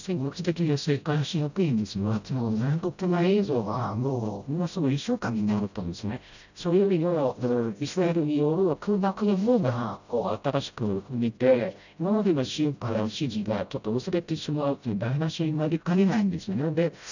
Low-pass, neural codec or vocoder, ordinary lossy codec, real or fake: 7.2 kHz; codec, 16 kHz, 0.5 kbps, FreqCodec, smaller model; none; fake